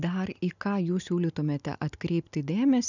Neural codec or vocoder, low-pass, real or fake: none; 7.2 kHz; real